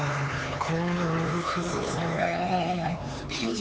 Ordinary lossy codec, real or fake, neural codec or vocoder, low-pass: none; fake; codec, 16 kHz, 4 kbps, X-Codec, HuBERT features, trained on LibriSpeech; none